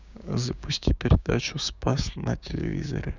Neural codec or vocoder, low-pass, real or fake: codec, 16 kHz, 6 kbps, DAC; 7.2 kHz; fake